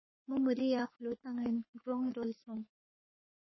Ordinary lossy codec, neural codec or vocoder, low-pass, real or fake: MP3, 24 kbps; codec, 44.1 kHz, 3.4 kbps, Pupu-Codec; 7.2 kHz; fake